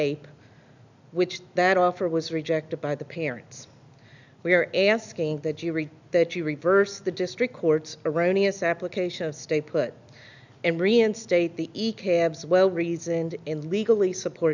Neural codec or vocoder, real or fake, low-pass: none; real; 7.2 kHz